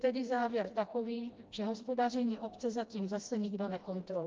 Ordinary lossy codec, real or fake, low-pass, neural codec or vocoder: Opus, 24 kbps; fake; 7.2 kHz; codec, 16 kHz, 1 kbps, FreqCodec, smaller model